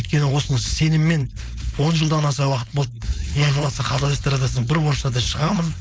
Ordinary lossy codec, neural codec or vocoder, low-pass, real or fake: none; codec, 16 kHz, 4.8 kbps, FACodec; none; fake